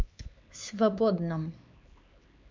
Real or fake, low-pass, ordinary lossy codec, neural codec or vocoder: fake; 7.2 kHz; MP3, 64 kbps; codec, 16 kHz, 4 kbps, X-Codec, HuBERT features, trained on LibriSpeech